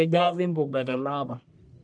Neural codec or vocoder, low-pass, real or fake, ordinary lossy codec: codec, 44.1 kHz, 1.7 kbps, Pupu-Codec; 9.9 kHz; fake; none